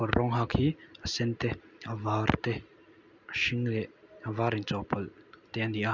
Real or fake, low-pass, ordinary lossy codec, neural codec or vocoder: real; 7.2 kHz; none; none